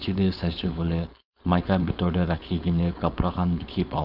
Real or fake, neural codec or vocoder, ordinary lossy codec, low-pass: fake; codec, 16 kHz, 4.8 kbps, FACodec; none; 5.4 kHz